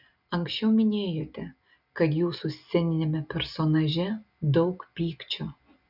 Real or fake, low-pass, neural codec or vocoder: real; 5.4 kHz; none